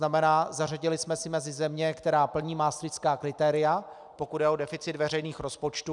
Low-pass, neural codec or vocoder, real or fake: 10.8 kHz; none; real